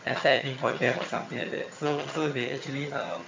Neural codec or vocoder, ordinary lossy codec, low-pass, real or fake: vocoder, 22.05 kHz, 80 mel bands, HiFi-GAN; MP3, 64 kbps; 7.2 kHz; fake